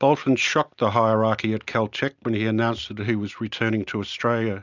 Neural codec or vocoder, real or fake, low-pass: none; real; 7.2 kHz